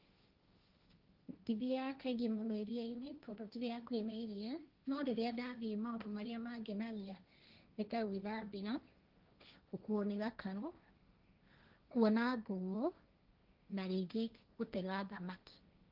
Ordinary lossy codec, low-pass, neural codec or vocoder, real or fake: Opus, 32 kbps; 5.4 kHz; codec, 16 kHz, 1.1 kbps, Voila-Tokenizer; fake